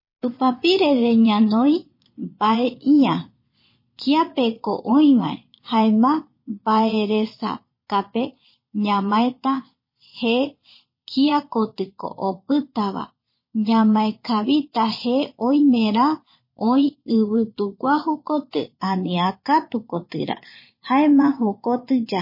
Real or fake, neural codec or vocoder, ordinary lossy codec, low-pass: fake; vocoder, 22.05 kHz, 80 mel bands, Vocos; MP3, 24 kbps; 5.4 kHz